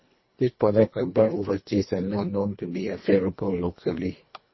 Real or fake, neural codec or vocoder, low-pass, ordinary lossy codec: fake; codec, 24 kHz, 1.5 kbps, HILCodec; 7.2 kHz; MP3, 24 kbps